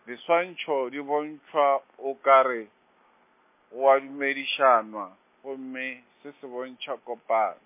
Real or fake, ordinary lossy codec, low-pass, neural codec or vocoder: real; MP3, 24 kbps; 3.6 kHz; none